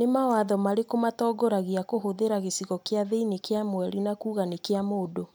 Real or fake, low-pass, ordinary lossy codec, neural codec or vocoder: real; none; none; none